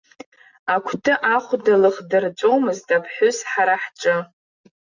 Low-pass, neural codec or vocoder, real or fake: 7.2 kHz; none; real